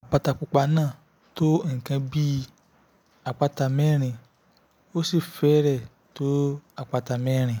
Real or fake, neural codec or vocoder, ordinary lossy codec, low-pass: real; none; none; none